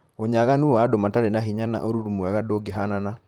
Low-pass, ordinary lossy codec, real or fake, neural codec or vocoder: 19.8 kHz; Opus, 32 kbps; fake; vocoder, 44.1 kHz, 128 mel bands, Pupu-Vocoder